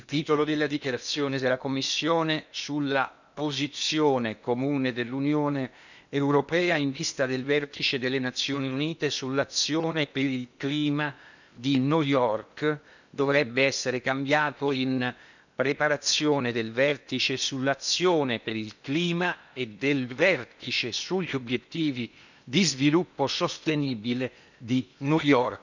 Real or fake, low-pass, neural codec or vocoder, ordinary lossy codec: fake; 7.2 kHz; codec, 16 kHz in and 24 kHz out, 0.8 kbps, FocalCodec, streaming, 65536 codes; none